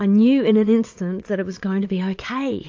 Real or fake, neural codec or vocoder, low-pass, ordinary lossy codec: fake; vocoder, 22.05 kHz, 80 mel bands, Vocos; 7.2 kHz; AAC, 48 kbps